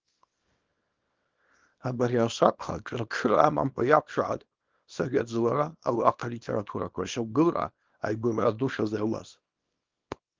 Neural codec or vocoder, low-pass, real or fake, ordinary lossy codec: codec, 24 kHz, 0.9 kbps, WavTokenizer, small release; 7.2 kHz; fake; Opus, 32 kbps